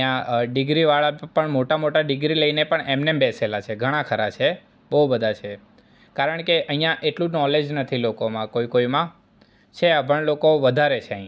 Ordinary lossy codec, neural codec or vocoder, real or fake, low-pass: none; none; real; none